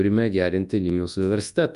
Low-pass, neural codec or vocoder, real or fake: 10.8 kHz; codec, 24 kHz, 0.9 kbps, WavTokenizer, large speech release; fake